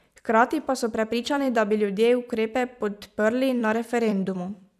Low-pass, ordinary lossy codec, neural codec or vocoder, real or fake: 14.4 kHz; none; vocoder, 44.1 kHz, 128 mel bands every 256 samples, BigVGAN v2; fake